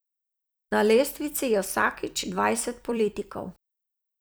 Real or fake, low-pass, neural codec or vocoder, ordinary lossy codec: fake; none; vocoder, 44.1 kHz, 128 mel bands every 256 samples, BigVGAN v2; none